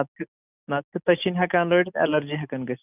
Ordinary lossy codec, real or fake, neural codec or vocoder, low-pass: none; real; none; 3.6 kHz